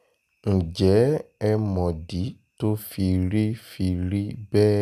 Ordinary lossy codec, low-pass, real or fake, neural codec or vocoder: none; 14.4 kHz; real; none